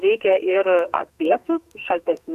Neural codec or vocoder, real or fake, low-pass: codec, 44.1 kHz, 2.6 kbps, SNAC; fake; 14.4 kHz